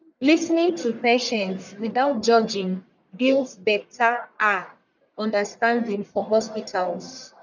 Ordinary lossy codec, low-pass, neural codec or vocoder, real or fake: none; 7.2 kHz; codec, 44.1 kHz, 1.7 kbps, Pupu-Codec; fake